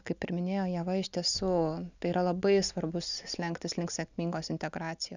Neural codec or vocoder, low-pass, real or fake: none; 7.2 kHz; real